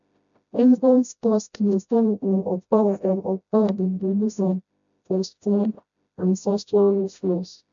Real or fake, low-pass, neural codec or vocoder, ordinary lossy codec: fake; 7.2 kHz; codec, 16 kHz, 0.5 kbps, FreqCodec, smaller model; MP3, 64 kbps